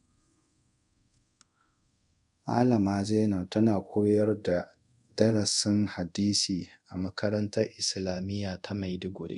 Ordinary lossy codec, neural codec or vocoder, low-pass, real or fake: none; codec, 24 kHz, 0.5 kbps, DualCodec; 10.8 kHz; fake